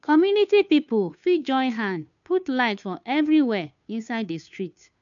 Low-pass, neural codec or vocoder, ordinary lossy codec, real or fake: 7.2 kHz; codec, 16 kHz, 2 kbps, FunCodec, trained on Chinese and English, 25 frames a second; none; fake